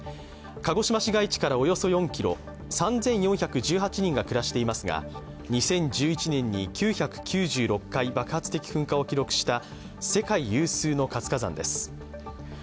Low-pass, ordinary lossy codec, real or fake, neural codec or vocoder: none; none; real; none